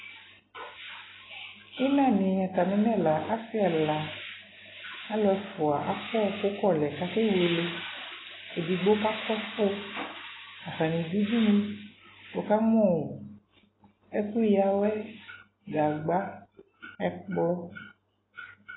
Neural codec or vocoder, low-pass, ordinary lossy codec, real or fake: none; 7.2 kHz; AAC, 16 kbps; real